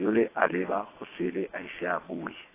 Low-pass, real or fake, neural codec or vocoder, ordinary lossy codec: 3.6 kHz; fake; vocoder, 22.05 kHz, 80 mel bands, WaveNeXt; AAC, 16 kbps